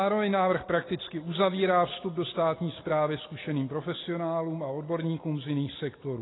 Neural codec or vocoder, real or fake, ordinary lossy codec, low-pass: none; real; AAC, 16 kbps; 7.2 kHz